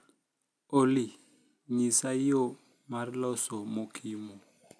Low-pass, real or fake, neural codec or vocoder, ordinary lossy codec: none; real; none; none